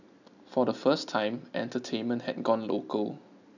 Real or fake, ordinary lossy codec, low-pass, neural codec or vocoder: real; none; 7.2 kHz; none